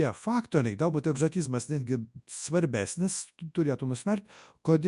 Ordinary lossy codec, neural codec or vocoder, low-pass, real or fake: MP3, 96 kbps; codec, 24 kHz, 0.9 kbps, WavTokenizer, large speech release; 10.8 kHz; fake